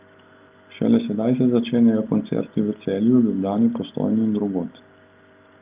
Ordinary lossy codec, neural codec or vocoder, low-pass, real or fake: Opus, 24 kbps; none; 3.6 kHz; real